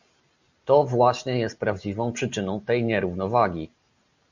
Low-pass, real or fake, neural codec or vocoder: 7.2 kHz; real; none